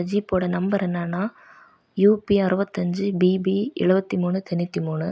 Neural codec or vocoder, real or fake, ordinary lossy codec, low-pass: none; real; none; none